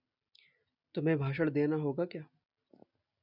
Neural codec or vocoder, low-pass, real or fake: none; 5.4 kHz; real